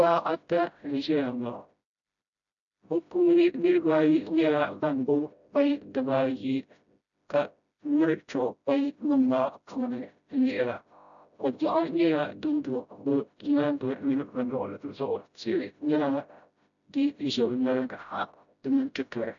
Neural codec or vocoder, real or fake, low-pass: codec, 16 kHz, 0.5 kbps, FreqCodec, smaller model; fake; 7.2 kHz